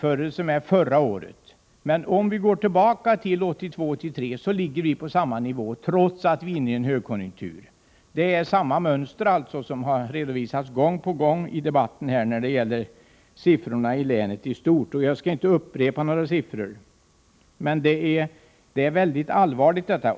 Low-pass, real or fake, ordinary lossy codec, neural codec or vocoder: none; real; none; none